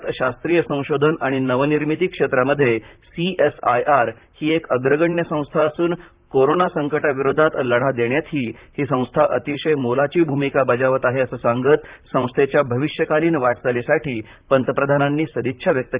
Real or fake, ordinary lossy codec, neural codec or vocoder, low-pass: fake; Opus, 64 kbps; vocoder, 44.1 kHz, 128 mel bands, Pupu-Vocoder; 3.6 kHz